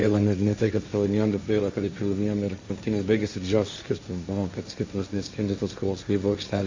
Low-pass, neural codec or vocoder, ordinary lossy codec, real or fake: 7.2 kHz; codec, 16 kHz, 1.1 kbps, Voila-Tokenizer; MP3, 64 kbps; fake